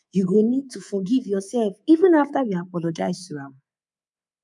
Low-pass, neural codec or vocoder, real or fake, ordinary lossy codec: 10.8 kHz; codec, 24 kHz, 3.1 kbps, DualCodec; fake; none